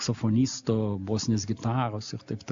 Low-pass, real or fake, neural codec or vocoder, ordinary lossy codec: 7.2 kHz; real; none; AAC, 64 kbps